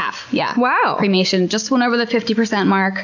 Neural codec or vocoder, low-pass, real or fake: none; 7.2 kHz; real